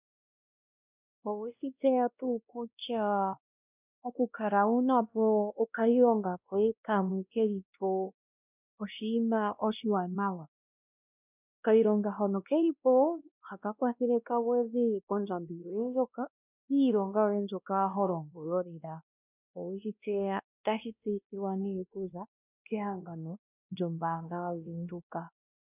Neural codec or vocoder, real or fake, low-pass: codec, 16 kHz, 1 kbps, X-Codec, WavLM features, trained on Multilingual LibriSpeech; fake; 3.6 kHz